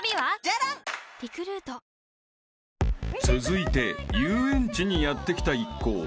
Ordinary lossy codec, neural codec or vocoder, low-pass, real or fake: none; none; none; real